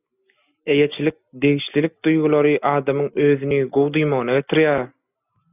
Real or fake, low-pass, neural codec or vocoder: real; 3.6 kHz; none